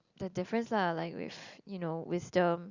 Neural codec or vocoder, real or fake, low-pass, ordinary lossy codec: none; real; 7.2 kHz; Opus, 64 kbps